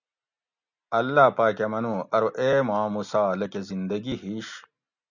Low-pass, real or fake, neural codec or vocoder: 7.2 kHz; real; none